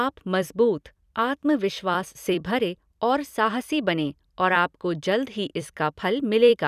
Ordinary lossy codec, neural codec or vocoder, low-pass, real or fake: none; vocoder, 44.1 kHz, 128 mel bands every 256 samples, BigVGAN v2; 14.4 kHz; fake